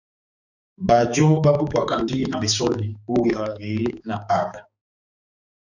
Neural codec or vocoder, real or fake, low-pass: codec, 16 kHz, 4 kbps, X-Codec, HuBERT features, trained on balanced general audio; fake; 7.2 kHz